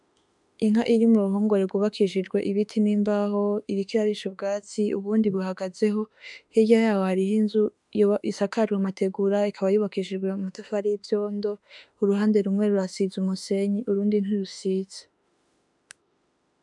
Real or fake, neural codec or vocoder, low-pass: fake; autoencoder, 48 kHz, 32 numbers a frame, DAC-VAE, trained on Japanese speech; 10.8 kHz